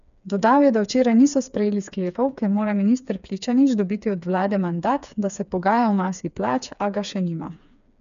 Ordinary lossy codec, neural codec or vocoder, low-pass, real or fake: none; codec, 16 kHz, 4 kbps, FreqCodec, smaller model; 7.2 kHz; fake